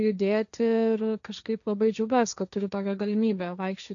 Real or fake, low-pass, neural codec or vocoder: fake; 7.2 kHz; codec, 16 kHz, 1.1 kbps, Voila-Tokenizer